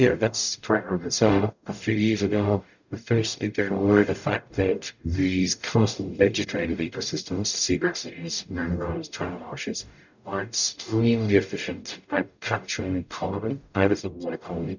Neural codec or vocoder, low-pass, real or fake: codec, 44.1 kHz, 0.9 kbps, DAC; 7.2 kHz; fake